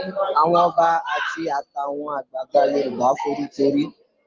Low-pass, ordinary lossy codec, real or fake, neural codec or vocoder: 7.2 kHz; Opus, 32 kbps; real; none